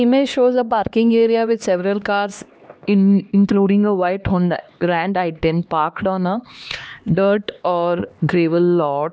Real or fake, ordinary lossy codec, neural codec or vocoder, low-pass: fake; none; codec, 16 kHz, 2 kbps, X-Codec, HuBERT features, trained on LibriSpeech; none